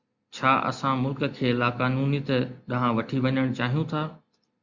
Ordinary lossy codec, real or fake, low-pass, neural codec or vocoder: Opus, 64 kbps; real; 7.2 kHz; none